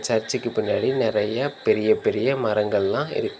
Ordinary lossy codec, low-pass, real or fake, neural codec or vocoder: none; none; real; none